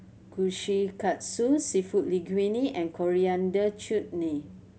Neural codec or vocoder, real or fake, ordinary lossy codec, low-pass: none; real; none; none